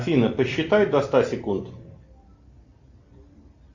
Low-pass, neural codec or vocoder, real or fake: 7.2 kHz; none; real